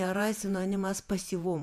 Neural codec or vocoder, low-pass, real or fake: vocoder, 48 kHz, 128 mel bands, Vocos; 14.4 kHz; fake